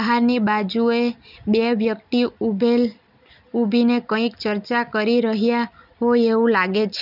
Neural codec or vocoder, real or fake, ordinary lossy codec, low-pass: none; real; none; 5.4 kHz